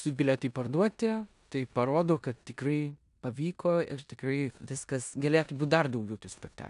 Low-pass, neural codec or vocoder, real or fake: 10.8 kHz; codec, 16 kHz in and 24 kHz out, 0.9 kbps, LongCat-Audio-Codec, four codebook decoder; fake